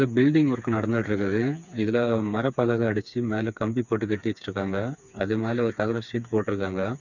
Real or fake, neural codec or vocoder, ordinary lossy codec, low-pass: fake; codec, 16 kHz, 4 kbps, FreqCodec, smaller model; none; 7.2 kHz